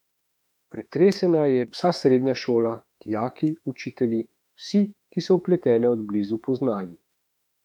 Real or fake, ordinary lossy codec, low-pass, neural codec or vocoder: fake; none; 19.8 kHz; autoencoder, 48 kHz, 32 numbers a frame, DAC-VAE, trained on Japanese speech